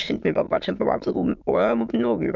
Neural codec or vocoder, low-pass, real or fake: autoencoder, 22.05 kHz, a latent of 192 numbers a frame, VITS, trained on many speakers; 7.2 kHz; fake